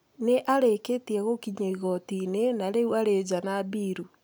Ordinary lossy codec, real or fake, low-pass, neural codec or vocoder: none; real; none; none